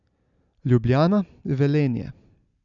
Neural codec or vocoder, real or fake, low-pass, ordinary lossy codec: none; real; 7.2 kHz; none